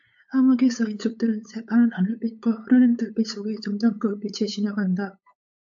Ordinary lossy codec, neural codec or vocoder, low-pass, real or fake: AAC, 64 kbps; codec, 16 kHz, 8 kbps, FunCodec, trained on LibriTTS, 25 frames a second; 7.2 kHz; fake